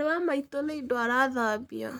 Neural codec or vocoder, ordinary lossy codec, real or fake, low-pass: codec, 44.1 kHz, 7.8 kbps, Pupu-Codec; none; fake; none